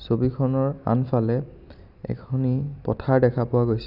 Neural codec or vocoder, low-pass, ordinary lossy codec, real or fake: none; 5.4 kHz; none; real